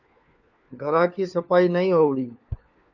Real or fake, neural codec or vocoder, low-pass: fake; codec, 16 kHz, 4 kbps, FunCodec, trained on LibriTTS, 50 frames a second; 7.2 kHz